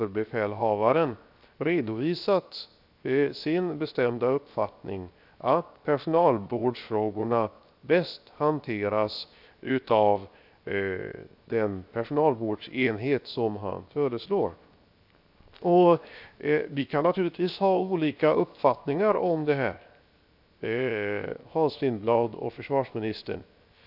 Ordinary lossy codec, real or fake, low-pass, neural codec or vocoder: AAC, 48 kbps; fake; 5.4 kHz; codec, 16 kHz, 0.7 kbps, FocalCodec